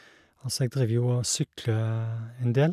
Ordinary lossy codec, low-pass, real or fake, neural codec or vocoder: none; 14.4 kHz; real; none